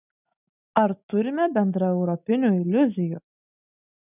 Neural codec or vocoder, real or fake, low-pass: none; real; 3.6 kHz